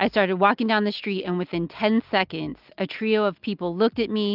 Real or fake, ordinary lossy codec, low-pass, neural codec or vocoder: real; Opus, 24 kbps; 5.4 kHz; none